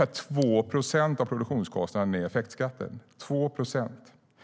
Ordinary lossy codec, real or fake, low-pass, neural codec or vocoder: none; real; none; none